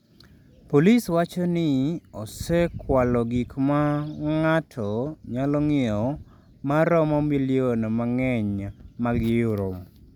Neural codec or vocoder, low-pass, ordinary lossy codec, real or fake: none; 19.8 kHz; none; real